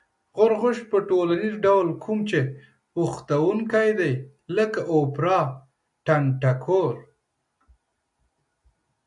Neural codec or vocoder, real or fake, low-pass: none; real; 10.8 kHz